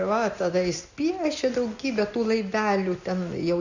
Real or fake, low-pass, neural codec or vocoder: real; 7.2 kHz; none